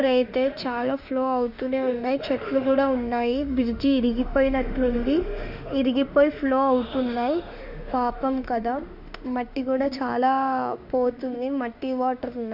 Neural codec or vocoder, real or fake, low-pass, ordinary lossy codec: autoencoder, 48 kHz, 32 numbers a frame, DAC-VAE, trained on Japanese speech; fake; 5.4 kHz; none